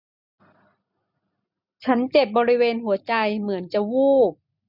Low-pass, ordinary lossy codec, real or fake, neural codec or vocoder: 5.4 kHz; none; real; none